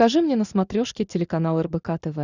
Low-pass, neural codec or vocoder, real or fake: 7.2 kHz; none; real